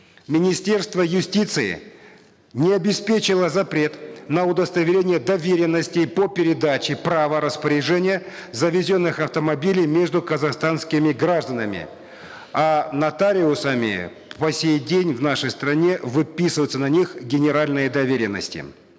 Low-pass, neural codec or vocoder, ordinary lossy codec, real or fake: none; none; none; real